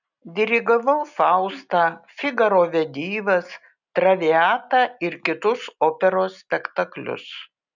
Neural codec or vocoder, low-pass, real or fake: none; 7.2 kHz; real